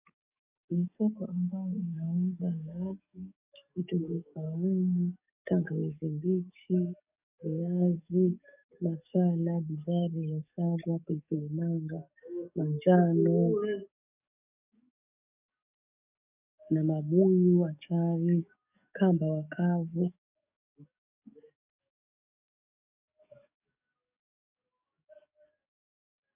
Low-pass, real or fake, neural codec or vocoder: 3.6 kHz; fake; codec, 44.1 kHz, 7.8 kbps, DAC